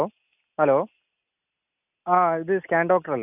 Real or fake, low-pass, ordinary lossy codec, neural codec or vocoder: real; 3.6 kHz; none; none